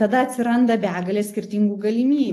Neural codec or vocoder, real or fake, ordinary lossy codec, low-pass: none; real; AAC, 48 kbps; 14.4 kHz